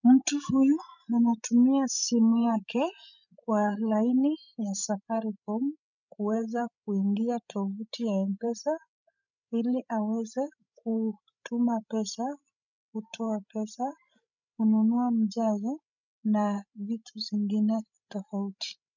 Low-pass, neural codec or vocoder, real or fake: 7.2 kHz; codec, 16 kHz, 16 kbps, FreqCodec, larger model; fake